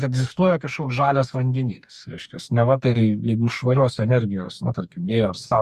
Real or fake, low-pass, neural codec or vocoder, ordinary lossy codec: fake; 14.4 kHz; codec, 44.1 kHz, 2.6 kbps, DAC; Opus, 64 kbps